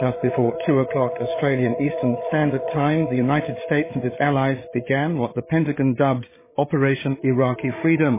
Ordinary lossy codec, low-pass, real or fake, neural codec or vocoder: MP3, 16 kbps; 3.6 kHz; fake; codec, 16 kHz, 8 kbps, FreqCodec, larger model